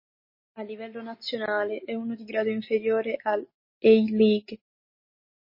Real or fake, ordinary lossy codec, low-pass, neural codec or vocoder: real; MP3, 24 kbps; 5.4 kHz; none